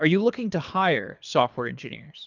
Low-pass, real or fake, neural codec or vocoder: 7.2 kHz; fake; codec, 24 kHz, 3 kbps, HILCodec